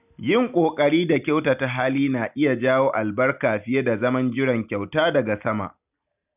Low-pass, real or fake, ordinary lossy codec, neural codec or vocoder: 3.6 kHz; real; none; none